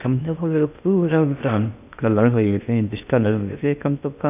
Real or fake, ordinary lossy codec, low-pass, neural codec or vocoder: fake; none; 3.6 kHz; codec, 16 kHz in and 24 kHz out, 0.6 kbps, FocalCodec, streaming, 2048 codes